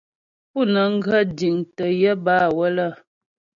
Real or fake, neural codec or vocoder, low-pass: real; none; 7.2 kHz